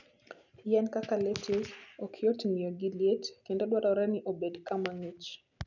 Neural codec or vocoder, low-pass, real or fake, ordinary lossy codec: none; 7.2 kHz; real; none